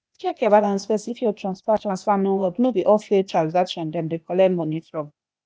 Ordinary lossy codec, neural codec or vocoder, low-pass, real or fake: none; codec, 16 kHz, 0.8 kbps, ZipCodec; none; fake